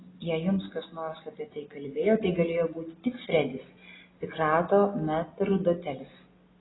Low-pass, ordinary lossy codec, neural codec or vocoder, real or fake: 7.2 kHz; AAC, 16 kbps; none; real